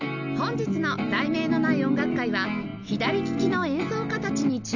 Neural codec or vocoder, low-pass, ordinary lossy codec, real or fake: none; 7.2 kHz; none; real